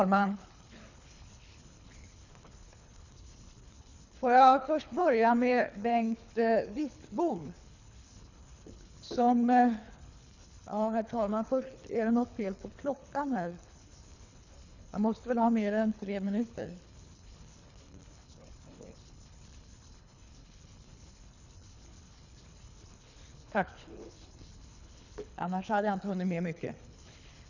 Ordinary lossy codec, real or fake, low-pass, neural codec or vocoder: none; fake; 7.2 kHz; codec, 24 kHz, 3 kbps, HILCodec